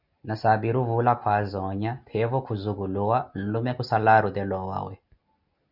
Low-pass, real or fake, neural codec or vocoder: 5.4 kHz; real; none